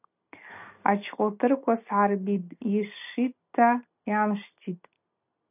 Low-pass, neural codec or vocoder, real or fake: 3.6 kHz; none; real